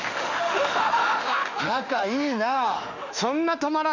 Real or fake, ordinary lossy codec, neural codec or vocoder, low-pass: fake; none; autoencoder, 48 kHz, 32 numbers a frame, DAC-VAE, trained on Japanese speech; 7.2 kHz